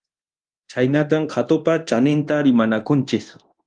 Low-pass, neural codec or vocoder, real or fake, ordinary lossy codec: 9.9 kHz; codec, 24 kHz, 0.9 kbps, DualCodec; fake; Opus, 32 kbps